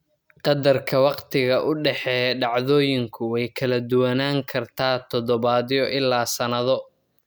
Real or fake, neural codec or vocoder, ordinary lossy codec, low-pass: real; none; none; none